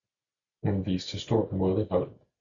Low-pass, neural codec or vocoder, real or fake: 7.2 kHz; none; real